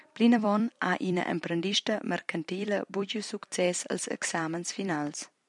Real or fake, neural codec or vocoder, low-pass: fake; vocoder, 44.1 kHz, 128 mel bands every 256 samples, BigVGAN v2; 10.8 kHz